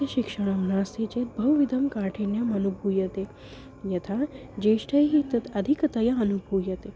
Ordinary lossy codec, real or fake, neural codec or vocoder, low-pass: none; real; none; none